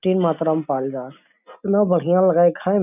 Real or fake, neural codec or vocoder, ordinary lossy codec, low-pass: real; none; none; 3.6 kHz